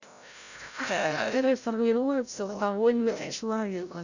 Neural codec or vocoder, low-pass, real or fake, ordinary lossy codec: codec, 16 kHz, 0.5 kbps, FreqCodec, larger model; 7.2 kHz; fake; none